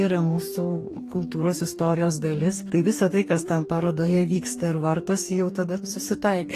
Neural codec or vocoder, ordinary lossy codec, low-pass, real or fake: codec, 44.1 kHz, 2.6 kbps, DAC; AAC, 48 kbps; 14.4 kHz; fake